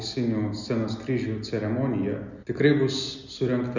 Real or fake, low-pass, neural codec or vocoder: real; 7.2 kHz; none